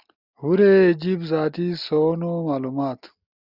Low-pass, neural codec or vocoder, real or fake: 5.4 kHz; none; real